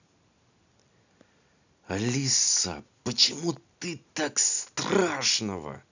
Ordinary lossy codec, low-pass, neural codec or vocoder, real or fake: none; 7.2 kHz; none; real